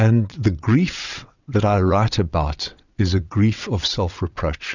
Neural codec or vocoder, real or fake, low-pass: vocoder, 22.05 kHz, 80 mel bands, WaveNeXt; fake; 7.2 kHz